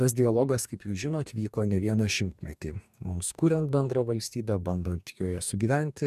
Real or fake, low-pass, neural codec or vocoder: fake; 14.4 kHz; codec, 44.1 kHz, 2.6 kbps, DAC